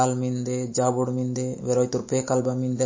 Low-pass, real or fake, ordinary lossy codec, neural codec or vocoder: 7.2 kHz; real; MP3, 32 kbps; none